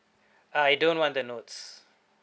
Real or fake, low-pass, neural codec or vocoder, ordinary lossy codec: real; none; none; none